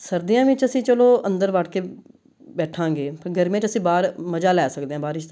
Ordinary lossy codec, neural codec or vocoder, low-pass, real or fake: none; none; none; real